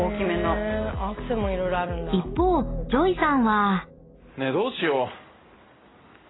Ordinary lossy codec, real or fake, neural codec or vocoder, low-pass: AAC, 16 kbps; real; none; 7.2 kHz